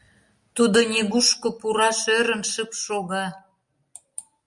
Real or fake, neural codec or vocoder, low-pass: real; none; 10.8 kHz